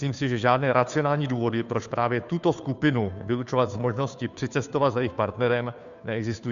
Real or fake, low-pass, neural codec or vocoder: fake; 7.2 kHz; codec, 16 kHz, 2 kbps, FunCodec, trained on Chinese and English, 25 frames a second